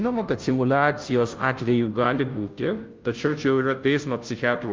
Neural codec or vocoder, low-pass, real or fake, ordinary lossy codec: codec, 16 kHz, 0.5 kbps, FunCodec, trained on Chinese and English, 25 frames a second; 7.2 kHz; fake; Opus, 24 kbps